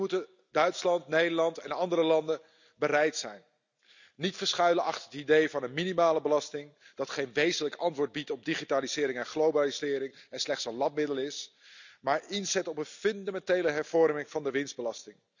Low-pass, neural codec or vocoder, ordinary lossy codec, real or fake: 7.2 kHz; none; none; real